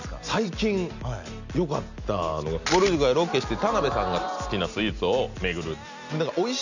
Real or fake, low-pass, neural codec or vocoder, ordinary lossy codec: real; 7.2 kHz; none; none